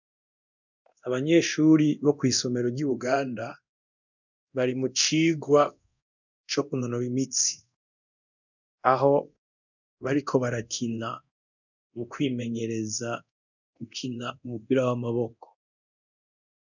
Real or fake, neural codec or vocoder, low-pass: fake; codec, 24 kHz, 0.9 kbps, DualCodec; 7.2 kHz